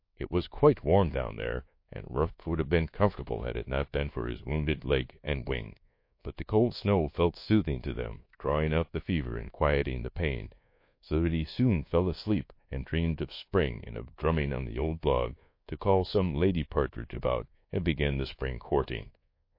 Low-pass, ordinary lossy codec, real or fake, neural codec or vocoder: 5.4 kHz; MP3, 32 kbps; fake; codec, 24 kHz, 1.2 kbps, DualCodec